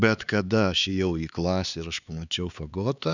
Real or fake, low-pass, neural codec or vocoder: fake; 7.2 kHz; codec, 16 kHz, 4 kbps, X-Codec, HuBERT features, trained on LibriSpeech